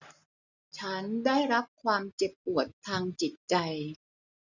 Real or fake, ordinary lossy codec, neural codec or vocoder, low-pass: real; none; none; 7.2 kHz